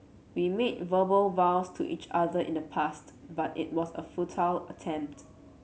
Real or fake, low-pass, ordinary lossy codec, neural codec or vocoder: real; none; none; none